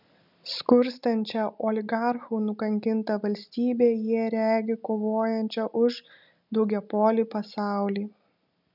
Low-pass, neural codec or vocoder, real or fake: 5.4 kHz; none; real